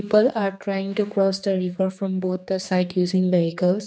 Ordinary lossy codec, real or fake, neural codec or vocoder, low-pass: none; fake; codec, 16 kHz, 2 kbps, X-Codec, HuBERT features, trained on general audio; none